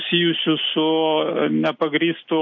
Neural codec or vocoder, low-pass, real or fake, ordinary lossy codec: none; 7.2 kHz; real; MP3, 48 kbps